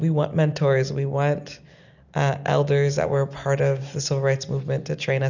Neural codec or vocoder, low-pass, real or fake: none; 7.2 kHz; real